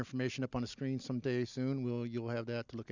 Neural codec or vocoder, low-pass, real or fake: none; 7.2 kHz; real